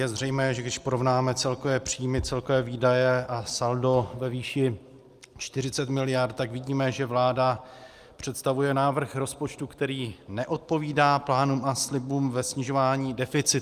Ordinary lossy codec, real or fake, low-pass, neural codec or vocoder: Opus, 32 kbps; real; 14.4 kHz; none